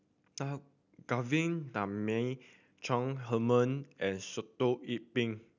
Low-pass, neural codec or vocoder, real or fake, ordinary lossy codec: 7.2 kHz; none; real; none